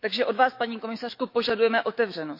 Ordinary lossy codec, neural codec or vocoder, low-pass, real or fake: MP3, 32 kbps; autoencoder, 48 kHz, 128 numbers a frame, DAC-VAE, trained on Japanese speech; 5.4 kHz; fake